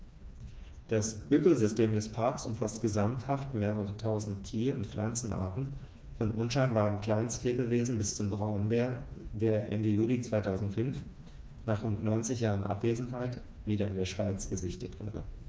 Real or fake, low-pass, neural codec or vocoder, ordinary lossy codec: fake; none; codec, 16 kHz, 2 kbps, FreqCodec, smaller model; none